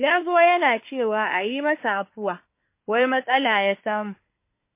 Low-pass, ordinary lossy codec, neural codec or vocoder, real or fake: 3.6 kHz; MP3, 24 kbps; codec, 24 kHz, 1 kbps, SNAC; fake